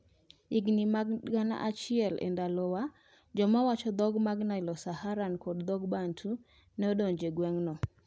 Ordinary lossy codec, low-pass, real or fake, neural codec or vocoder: none; none; real; none